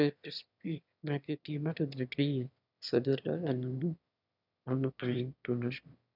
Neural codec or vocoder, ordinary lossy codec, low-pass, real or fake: autoencoder, 22.05 kHz, a latent of 192 numbers a frame, VITS, trained on one speaker; none; 5.4 kHz; fake